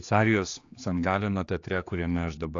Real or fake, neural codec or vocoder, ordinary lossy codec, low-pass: fake; codec, 16 kHz, 2 kbps, X-Codec, HuBERT features, trained on general audio; AAC, 48 kbps; 7.2 kHz